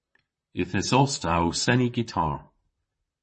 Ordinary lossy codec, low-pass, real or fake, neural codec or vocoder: MP3, 32 kbps; 10.8 kHz; fake; vocoder, 44.1 kHz, 128 mel bands, Pupu-Vocoder